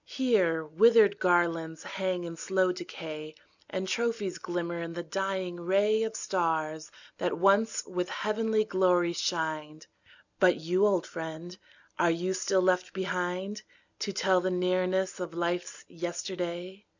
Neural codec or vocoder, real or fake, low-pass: none; real; 7.2 kHz